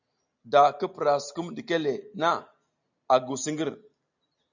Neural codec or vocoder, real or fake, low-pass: none; real; 7.2 kHz